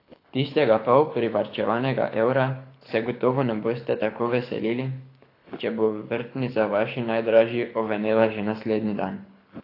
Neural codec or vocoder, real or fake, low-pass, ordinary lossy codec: codec, 24 kHz, 6 kbps, HILCodec; fake; 5.4 kHz; AAC, 32 kbps